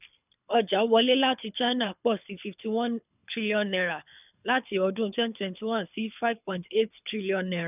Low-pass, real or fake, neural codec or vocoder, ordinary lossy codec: 3.6 kHz; fake; codec, 24 kHz, 6 kbps, HILCodec; none